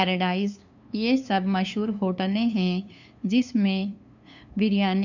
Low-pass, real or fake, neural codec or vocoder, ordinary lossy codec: 7.2 kHz; fake; codec, 16 kHz, 2 kbps, FunCodec, trained on LibriTTS, 25 frames a second; none